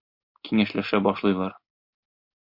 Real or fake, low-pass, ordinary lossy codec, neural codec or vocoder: real; 5.4 kHz; MP3, 48 kbps; none